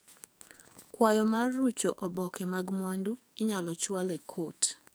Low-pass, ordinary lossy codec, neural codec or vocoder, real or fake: none; none; codec, 44.1 kHz, 2.6 kbps, SNAC; fake